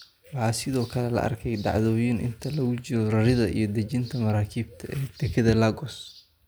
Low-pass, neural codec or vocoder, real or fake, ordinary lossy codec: none; none; real; none